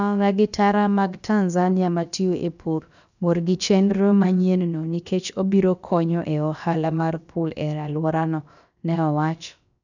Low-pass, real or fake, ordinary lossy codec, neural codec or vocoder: 7.2 kHz; fake; none; codec, 16 kHz, about 1 kbps, DyCAST, with the encoder's durations